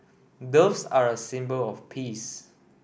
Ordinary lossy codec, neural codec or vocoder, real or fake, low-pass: none; none; real; none